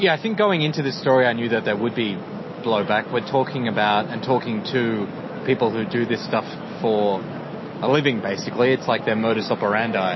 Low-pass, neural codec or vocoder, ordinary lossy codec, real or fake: 7.2 kHz; none; MP3, 24 kbps; real